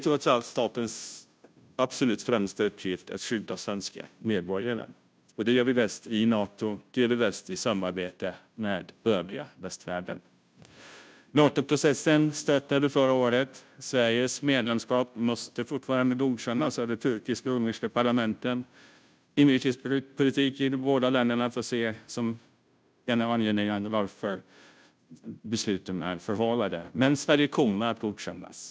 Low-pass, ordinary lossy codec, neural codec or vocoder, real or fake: none; none; codec, 16 kHz, 0.5 kbps, FunCodec, trained on Chinese and English, 25 frames a second; fake